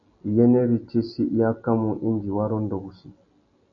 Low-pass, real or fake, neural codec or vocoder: 7.2 kHz; real; none